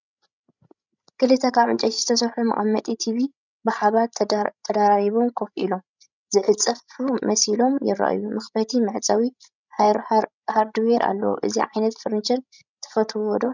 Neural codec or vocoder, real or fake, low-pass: codec, 16 kHz, 16 kbps, FreqCodec, larger model; fake; 7.2 kHz